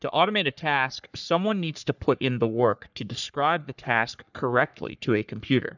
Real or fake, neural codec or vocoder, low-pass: fake; codec, 44.1 kHz, 3.4 kbps, Pupu-Codec; 7.2 kHz